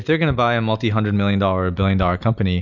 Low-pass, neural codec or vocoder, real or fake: 7.2 kHz; none; real